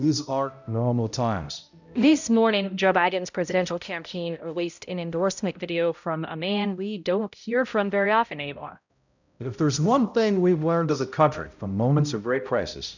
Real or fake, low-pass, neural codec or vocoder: fake; 7.2 kHz; codec, 16 kHz, 0.5 kbps, X-Codec, HuBERT features, trained on balanced general audio